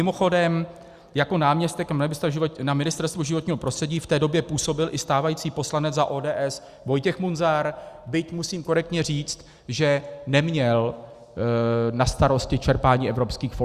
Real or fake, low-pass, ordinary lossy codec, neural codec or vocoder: real; 14.4 kHz; Opus, 64 kbps; none